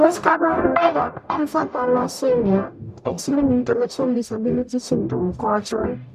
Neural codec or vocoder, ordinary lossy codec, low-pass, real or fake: codec, 44.1 kHz, 0.9 kbps, DAC; none; 14.4 kHz; fake